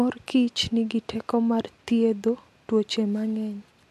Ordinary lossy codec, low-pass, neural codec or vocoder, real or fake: MP3, 64 kbps; 14.4 kHz; none; real